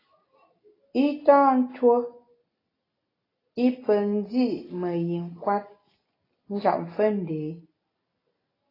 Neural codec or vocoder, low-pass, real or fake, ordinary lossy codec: none; 5.4 kHz; real; AAC, 24 kbps